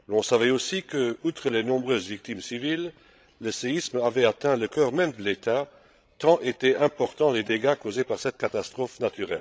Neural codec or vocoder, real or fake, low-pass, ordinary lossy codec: codec, 16 kHz, 16 kbps, FreqCodec, larger model; fake; none; none